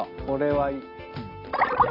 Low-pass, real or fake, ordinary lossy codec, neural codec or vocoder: 5.4 kHz; real; none; none